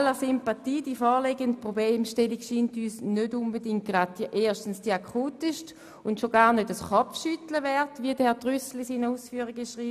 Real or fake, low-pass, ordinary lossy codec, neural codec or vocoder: real; 14.4 kHz; none; none